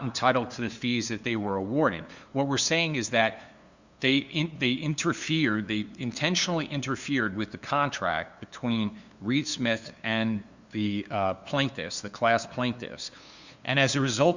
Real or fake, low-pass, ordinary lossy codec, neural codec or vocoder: fake; 7.2 kHz; Opus, 64 kbps; codec, 16 kHz, 2 kbps, FunCodec, trained on LibriTTS, 25 frames a second